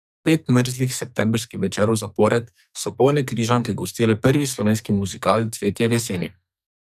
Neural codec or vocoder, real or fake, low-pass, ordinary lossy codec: codec, 44.1 kHz, 2.6 kbps, SNAC; fake; 14.4 kHz; none